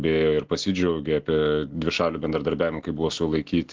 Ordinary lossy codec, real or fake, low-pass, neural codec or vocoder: Opus, 16 kbps; real; 7.2 kHz; none